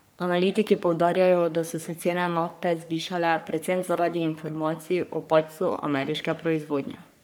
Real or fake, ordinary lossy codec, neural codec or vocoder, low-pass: fake; none; codec, 44.1 kHz, 3.4 kbps, Pupu-Codec; none